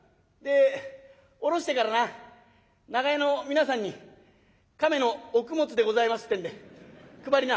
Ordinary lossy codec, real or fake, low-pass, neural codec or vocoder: none; real; none; none